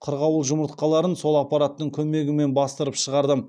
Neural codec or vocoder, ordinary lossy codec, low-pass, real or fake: none; none; none; real